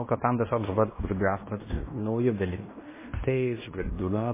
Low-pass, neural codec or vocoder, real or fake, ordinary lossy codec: 3.6 kHz; codec, 16 kHz in and 24 kHz out, 0.9 kbps, LongCat-Audio-Codec, fine tuned four codebook decoder; fake; MP3, 16 kbps